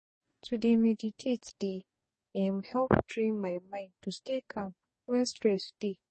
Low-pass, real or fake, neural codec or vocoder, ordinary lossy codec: 10.8 kHz; fake; codec, 44.1 kHz, 2.6 kbps, DAC; MP3, 32 kbps